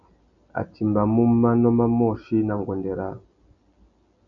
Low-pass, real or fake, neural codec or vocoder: 7.2 kHz; real; none